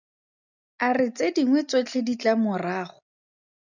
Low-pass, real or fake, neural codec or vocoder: 7.2 kHz; real; none